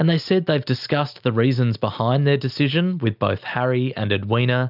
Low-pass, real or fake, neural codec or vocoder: 5.4 kHz; real; none